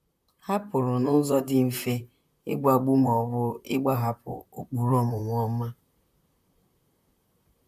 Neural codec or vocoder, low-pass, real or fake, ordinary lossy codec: vocoder, 44.1 kHz, 128 mel bands, Pupu-Vocoder; 14.4 kHz; fake; none